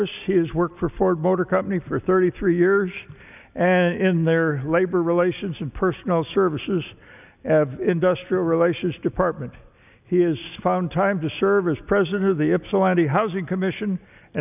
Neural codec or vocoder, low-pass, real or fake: none; 3.6 kHz; real